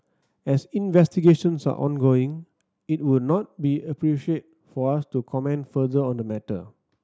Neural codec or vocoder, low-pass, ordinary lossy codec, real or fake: none; none; none; real